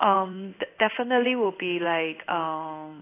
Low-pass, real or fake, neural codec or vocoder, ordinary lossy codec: 3.6 kHz; fake; codec, 16 kHz in and 24 kHz out, 1 kbps, XY-Tokenizer; AAC, 24 kbps